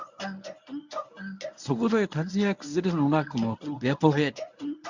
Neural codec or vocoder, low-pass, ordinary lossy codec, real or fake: codec, 24 kHz, 0.9 kbps, WavTokenizer, medium speech release version 1; 7.2 kHz; none; fake